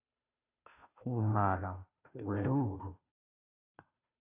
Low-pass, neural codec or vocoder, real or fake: 3.6 kHz; codec, 16 kHz, 0.5 kbps, FunCodec, trained on Chinese and English, 25 frames a second; fake